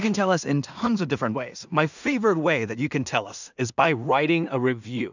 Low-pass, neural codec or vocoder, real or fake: 7.2 kHz; codec, 16 kHz in and 24 kHz out, 0.4 kbps, LongCat-Audio-Codec, two codebook decoder; fake